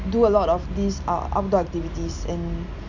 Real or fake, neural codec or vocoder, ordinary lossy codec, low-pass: real; none; none; 7.2 kHz